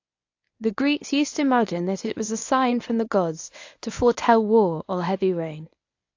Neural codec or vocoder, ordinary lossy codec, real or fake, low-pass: codec, 24 kHz, 0.9 kbps, WavTokenizer, medium speech release version 1; AAC, 48 kbps; fake; 7.2 kHz